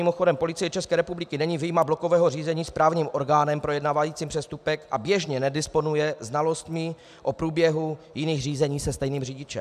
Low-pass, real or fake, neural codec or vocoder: 14.4 kHz; real; none